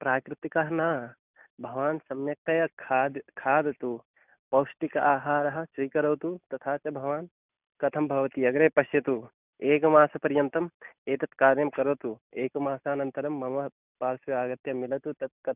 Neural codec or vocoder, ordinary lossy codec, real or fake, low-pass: none; none; real; 3.6 kHz